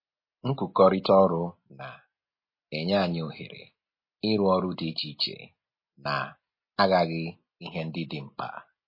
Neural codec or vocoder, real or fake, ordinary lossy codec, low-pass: none; real; MP3, 24 kbps; 5.4 kHz